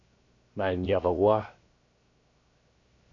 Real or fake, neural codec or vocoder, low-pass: fake; codec, 16 kHz, 0.7 kbps, FocalCodec; 7.2 kHz